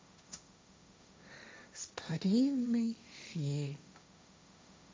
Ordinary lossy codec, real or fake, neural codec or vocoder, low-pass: none; fake; codec, 16 kHz, 1.1 kbps, Voila-Tokenizer; none